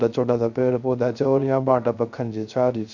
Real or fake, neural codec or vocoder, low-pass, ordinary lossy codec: fake; codec, 16 kHz, 0.3 kbps, FocalCodec; 7.2 kHz; none